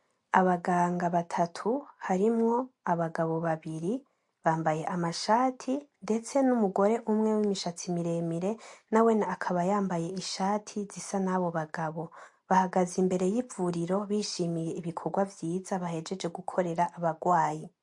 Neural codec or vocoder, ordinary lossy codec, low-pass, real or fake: none; MP3, 48 kbps; 10.8 kHz; real